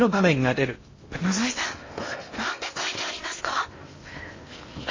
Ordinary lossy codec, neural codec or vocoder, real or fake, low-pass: MP3, 32 kbps; codec, 16 kHz in and 24 kHz out, 0.6 kbps, FocalCodec, streaming, 2048 codes; fake; 7.2 kHz